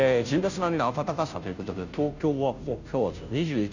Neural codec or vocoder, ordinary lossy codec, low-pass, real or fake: codec, 16 kHz, 0.5 kbps, FunCodec, trained on Chinese and English, 25 frames a second; none; 7.2 kHz; fake